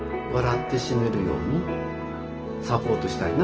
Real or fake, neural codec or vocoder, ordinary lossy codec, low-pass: real; none; Opus, 16 kbps; 7.2 kHz